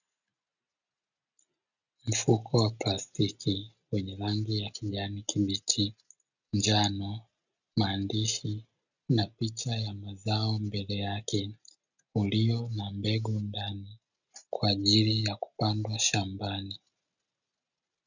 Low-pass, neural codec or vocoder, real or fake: 7.2 kHz; none; real